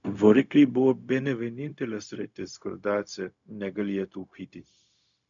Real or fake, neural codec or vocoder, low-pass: fake; codec, 16 kHz, 0.4 kbps, LongCat-Audio-Codec; 7.2 kHz